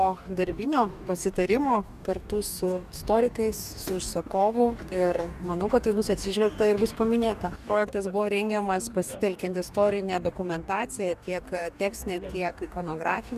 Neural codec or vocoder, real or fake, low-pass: codec, 44.1 kHz, 2.6 kbps, DAC; fake; 14.4 kHz